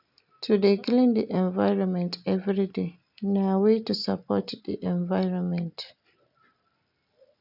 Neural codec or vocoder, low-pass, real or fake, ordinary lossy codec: none; 5.4 kHz; real; none